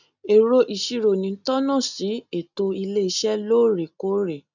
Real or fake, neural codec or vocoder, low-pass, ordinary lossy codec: real; none; 7.2 kHz; none